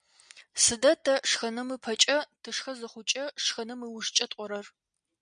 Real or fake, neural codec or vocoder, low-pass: real; none; 9.9 kHz